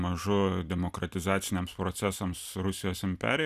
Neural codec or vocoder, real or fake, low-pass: none; real; 14.4 kHz